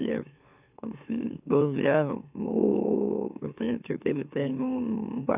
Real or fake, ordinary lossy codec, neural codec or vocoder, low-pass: fake; none; autoencoder, 44.1 kHz, a latent of 192 numbers a frame, MeloTTS; 3.6 kHz